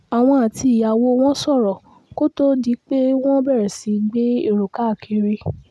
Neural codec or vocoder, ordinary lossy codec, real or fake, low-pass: none; none; real; none